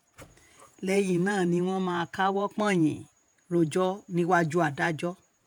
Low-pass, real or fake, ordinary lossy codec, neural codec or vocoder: none; fake; none; vocoder, 48 kHz, 128 mel bands, Vocos